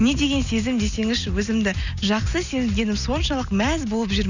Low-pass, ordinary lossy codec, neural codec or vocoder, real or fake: 7.2 kHz; none; none; real